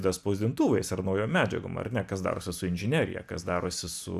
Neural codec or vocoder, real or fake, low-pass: none; real; 14.4 kHz